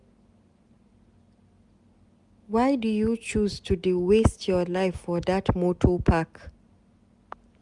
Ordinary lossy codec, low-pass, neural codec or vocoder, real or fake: Opus, 64 kbps; 10.8 kHz; none; real